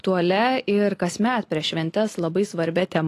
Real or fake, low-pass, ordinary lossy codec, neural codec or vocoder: real; 14.4 kHz; AAC, 48 kbps; none